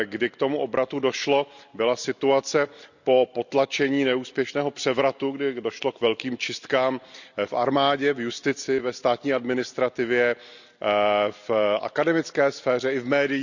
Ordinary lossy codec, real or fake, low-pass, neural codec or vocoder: none; real; 7.2 kHz; none